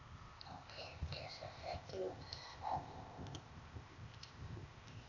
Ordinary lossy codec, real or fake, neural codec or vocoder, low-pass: none; fake; codec, 16 kHz, 0.8 kbps, ZipCodec; 7.2 kHz